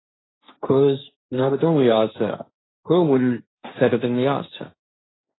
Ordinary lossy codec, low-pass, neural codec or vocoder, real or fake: AAC, 16 kbps; 7.2 kHz; codec, 16 kHz, 1.1 kbps, Voila-Tokenizer; fake